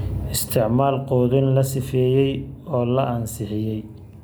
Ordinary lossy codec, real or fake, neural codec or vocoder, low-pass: none; real; none; none